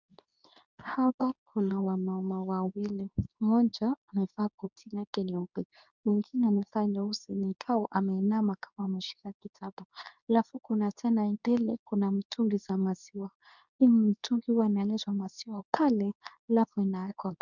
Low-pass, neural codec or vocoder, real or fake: 7.2 kHz; codec, 24 kHz, 0.9 kbps, WavTokenizer, medium speech release version 2; fake